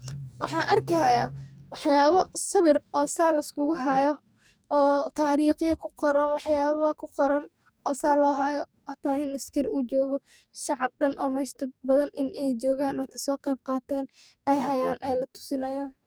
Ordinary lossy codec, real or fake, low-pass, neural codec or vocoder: none; fake; none; codec, 44.1 kHz, 2.6 kbps, DAC